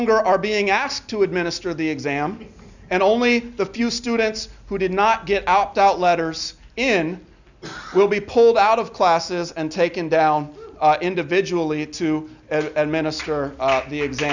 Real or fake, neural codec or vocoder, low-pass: real; none; 7.2 kHz